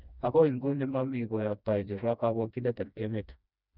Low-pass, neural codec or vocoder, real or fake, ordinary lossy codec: 5.4 kHz; codec, 16 kHz, 1 kbps, FreqCodec, smaller model; fake; none